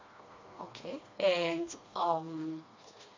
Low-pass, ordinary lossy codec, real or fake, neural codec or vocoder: 7.2 kHz; MP3, 48 kbps; fake; codec, 16 kHz, 2 kbps, FreqCodec, smaller model